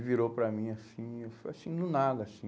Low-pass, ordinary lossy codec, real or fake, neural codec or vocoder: none; none; real; none